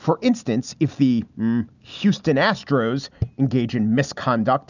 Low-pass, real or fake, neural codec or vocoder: 7.2 kHz; real; none